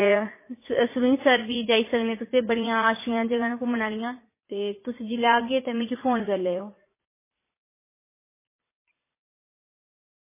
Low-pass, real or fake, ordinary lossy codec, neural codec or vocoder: 3.6 kHz; fake; MP3, 16 kbps; vocoder, 44.1 kHz, 80 mel bands, Vocos